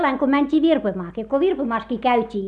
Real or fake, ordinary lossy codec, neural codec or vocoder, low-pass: real; none; none; none